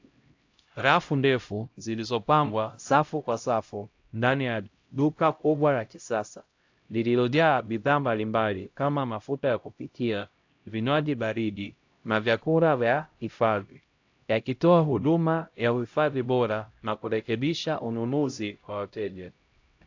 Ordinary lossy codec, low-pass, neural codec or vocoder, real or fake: AAC, 48 kbps; 7.2 kHz; codec, 16 kHz, 0.5 kbps, X-Codec, HuBERT features, trained on LibriSpeech; fake